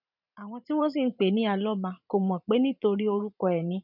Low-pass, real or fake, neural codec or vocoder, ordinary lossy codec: 5.4 kHz; fake; vocoder, 44.1 kHz, 128 mel bands every 512 samples, BigVGAN v2; none